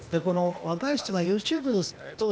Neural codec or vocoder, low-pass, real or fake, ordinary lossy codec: codec, 16 kHz, 0.8 kbps, ZipCodec; none; fake; none